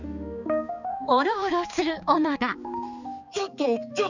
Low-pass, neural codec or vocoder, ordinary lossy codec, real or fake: 7.2 kHz; codec, 16 kHz, 4 kbps, X-Codec, HuBERT features, trained on balanced general audio; none; fake